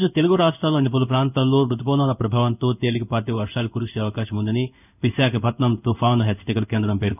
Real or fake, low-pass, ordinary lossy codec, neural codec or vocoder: fake; 3.6 kHz; none; codec, 16 kHz in and 24 kHz out, 1 kbps, XY-Tokenizer